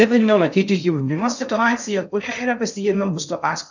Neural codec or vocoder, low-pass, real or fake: codec, 16 kHz in and 24 kHz out, 0.6 kbps, FocalCodec, streaming, 2048 codes; 7.2 kHz; fake